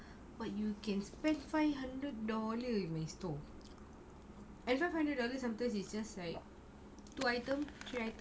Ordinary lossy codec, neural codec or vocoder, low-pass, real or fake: none; none; none; real